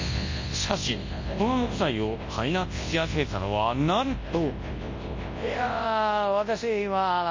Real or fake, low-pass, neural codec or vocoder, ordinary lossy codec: fake; 7.2 kHz; codec, 24 kHz, 0.9 kbps, WavTokenizer, large speech release; MP3, 32 kbps